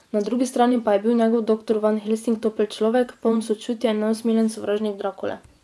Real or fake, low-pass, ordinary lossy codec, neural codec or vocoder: fake; none; none; vocoder, 24 kHz, 100 mel bands, Vocos